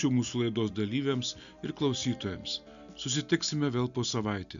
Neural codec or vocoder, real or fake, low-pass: none; real; 7.2 kHz